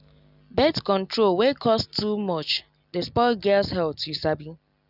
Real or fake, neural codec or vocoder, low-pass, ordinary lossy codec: real; none; 5.4 kHz; none